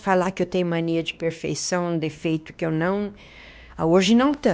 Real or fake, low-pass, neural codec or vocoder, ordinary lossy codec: fake; none; codec, 16 kHz, 2 kbps, X-Codec, WavLM features, trained on Multilingual LibriSpeech; none